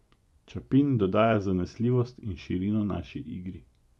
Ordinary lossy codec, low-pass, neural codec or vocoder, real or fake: none; none; vocoder, 24 kHz, 100 mel bands, Vocos; fake